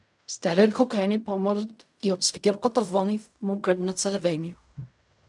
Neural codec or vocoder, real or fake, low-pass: codec, 16 kHz in and 24 kHz out, 0.4 kbps, LongCat-Audio-Codec, fine tuned four codebook decoder; fake; 10.8 kHz